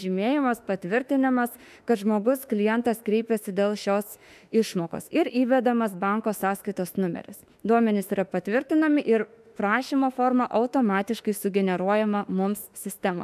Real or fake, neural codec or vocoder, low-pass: fake; autoencoder, 48 kHz, 32 numbers a frame, DAC-VAE, trained on Japanese speech; 14.4 kHz